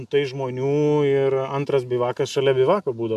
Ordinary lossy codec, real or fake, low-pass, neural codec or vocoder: MP3, 96 kbps; real; 14.4 kHz; none